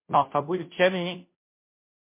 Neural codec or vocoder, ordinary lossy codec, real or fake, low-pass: codec, 16 kHz, 0.5 kbps, FunCodec, trained on Chinese and English, 25 frames a second; MP3, 24 kbps; fake; 3.6 kHz